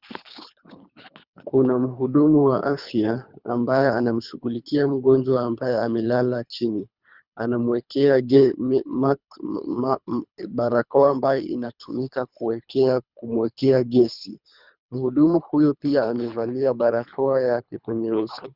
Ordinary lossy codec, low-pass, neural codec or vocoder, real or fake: Opus, 64 kbps; 5.4 kHz; codec, 24 kHz, 3 kbps, HILCodec; fake